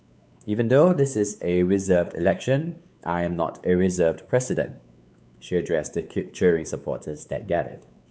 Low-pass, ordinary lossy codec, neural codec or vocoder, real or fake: none; none; codec, 16 kHz, 4 kbps, X-Codec, WavLM features, trained on Multilingual LibriSpeech; fake